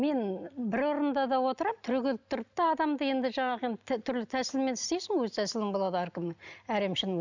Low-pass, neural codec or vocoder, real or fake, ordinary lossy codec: 7.2 kHz; none; real; none